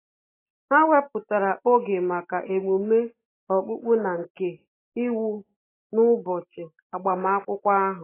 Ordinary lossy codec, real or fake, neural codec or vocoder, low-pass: AAC, 16 kbps; real; none; 3.6 kHz